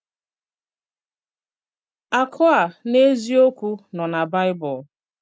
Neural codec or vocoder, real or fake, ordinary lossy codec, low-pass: none; real; none; none